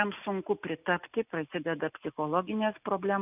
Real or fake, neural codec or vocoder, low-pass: real; none; 3.6 kHz